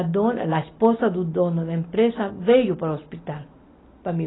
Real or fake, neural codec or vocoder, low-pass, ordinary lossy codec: real; none; 7.2 kHz; AAC, 16 kbps